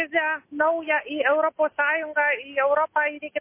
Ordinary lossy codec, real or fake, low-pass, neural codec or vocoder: MP3, 24 kbps; real; 3.6 kHz; none